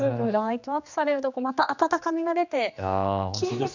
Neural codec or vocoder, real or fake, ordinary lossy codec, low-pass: codec, 16 kHz, 2 kbps, X-Codec, HuBERT features, trained on general audio; fake; none; 7.2 kHz